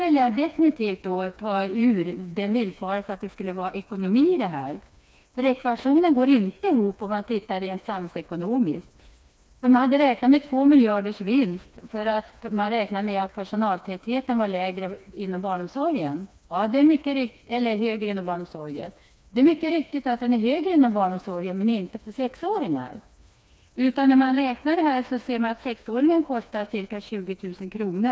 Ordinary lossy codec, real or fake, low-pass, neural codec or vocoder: none; fake; none; codec, 16 kHz, 2 kbps, FreqCodec, smaller model